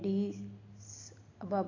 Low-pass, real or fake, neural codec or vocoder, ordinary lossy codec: 7.2 kHz; real; none; AAC, 32 kbps